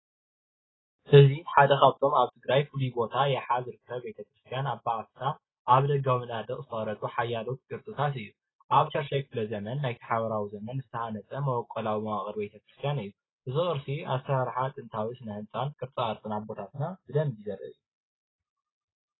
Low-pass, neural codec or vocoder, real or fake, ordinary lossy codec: 7.2 kHz; none; real; AAC, 16 kbps